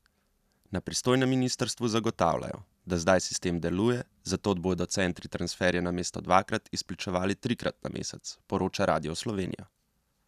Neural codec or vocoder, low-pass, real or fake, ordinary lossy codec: none; 14.4 kHz; real; none